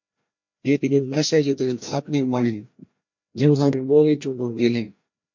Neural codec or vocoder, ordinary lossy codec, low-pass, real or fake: codec, 16 kHz, 1 kbps, FreqCodec, larger model; MP3, 48 kbps; 7.2 kHz; fake